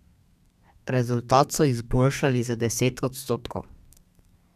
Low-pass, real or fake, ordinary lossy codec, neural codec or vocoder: 14.4 kHz; fake; none; codec, 32 kHz, 1.9 kbps, SNAC